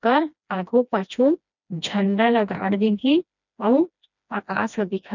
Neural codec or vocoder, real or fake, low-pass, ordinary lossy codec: codec, 16 kHz, 1 kbps, FreqCodec, smaller model; fake; 7.2 kHz; none